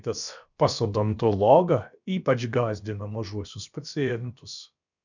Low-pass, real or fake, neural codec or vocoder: 7.2 kHz; fake; codec, 16 kHz, about 1 kbps, DyCAST, with the encoder's durations